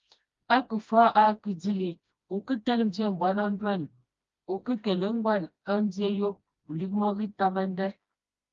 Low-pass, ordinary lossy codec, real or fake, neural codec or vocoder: 7.2 kHz; Opus, 32 kbps; fake; codec, 16 kHz, 1 kbps, FreqCodec, smaller model